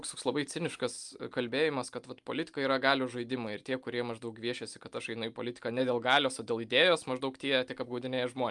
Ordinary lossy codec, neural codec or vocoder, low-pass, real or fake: Opus, 32 kbps; none; 10.8 kHz; real